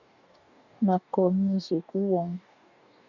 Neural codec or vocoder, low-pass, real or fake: codec, 44.1 kHz, 2.6 kbps, DAC; 7.2 kHz; fake